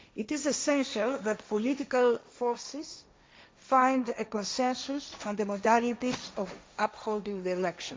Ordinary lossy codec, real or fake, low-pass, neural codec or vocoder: none; fake; none; codec, 16 kHz, 1.1 kbps, Voila-Tokenizer